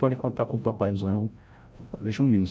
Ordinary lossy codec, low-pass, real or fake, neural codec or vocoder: none; none; fake; codec, 16 kHz, 0.5 kbps, FreqCodec, larger model